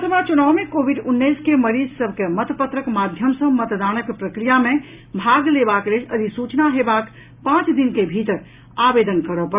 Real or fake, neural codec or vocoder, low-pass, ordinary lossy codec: real; none; 3.6 kHz; Opus, 64 kbps